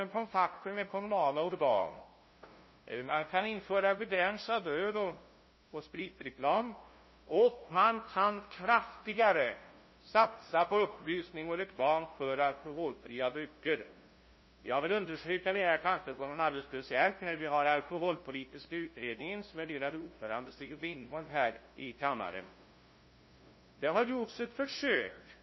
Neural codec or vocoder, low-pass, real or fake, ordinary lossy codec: codec, 16 kHz, 0.5 kbps, FunCodec, trained on LibriTTS, 25 frames a second; 7.2 kHz; fake; MP3, 24 kbps